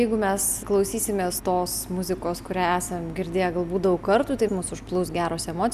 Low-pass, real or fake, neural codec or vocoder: 14.4 kHz; real; none